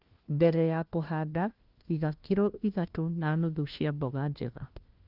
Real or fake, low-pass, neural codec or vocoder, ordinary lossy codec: fake; 5.4 kHz; codec, 16 kHz, 1 kbps, FunCodec, trained on LibriTTS, 50 frames a second; Opus, 24 kbps